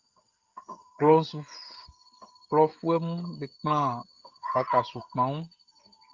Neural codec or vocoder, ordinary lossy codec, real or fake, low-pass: codec, 16 kHz, 16 kbps, FreqCodec, smaller model; Opus, 32 kbps; fake; 7.2 kHz